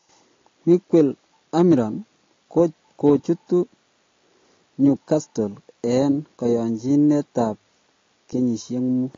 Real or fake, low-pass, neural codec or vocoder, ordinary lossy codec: real; 7.2 kHz; none; AAC, 32 kbps